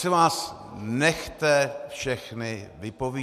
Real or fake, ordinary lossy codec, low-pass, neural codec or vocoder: real; MP3, 96 kbps; 14.4 kHz; none